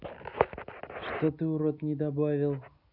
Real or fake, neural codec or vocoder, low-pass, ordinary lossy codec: real; none; 5.4 kHz; none